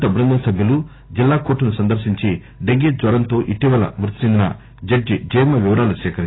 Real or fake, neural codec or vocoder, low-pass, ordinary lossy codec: real; none; 7.2 kHz; AAC, 16 kbps